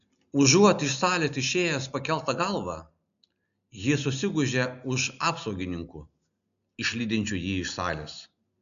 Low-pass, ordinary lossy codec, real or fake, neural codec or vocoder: 7.2 kHz; MP3, 96 kbps; real; none